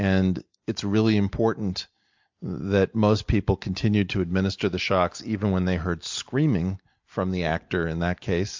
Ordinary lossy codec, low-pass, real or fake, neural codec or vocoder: MP3, 64 kbps; 7.2 kHz; real; none